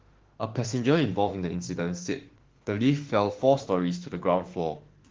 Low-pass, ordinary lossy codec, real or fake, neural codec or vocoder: 7.2 kHz; Opus, 16 kbps; fake; autoencoder, 48 kHz, 32 numbers a frame, DAC-VAE, trained on Japanese speech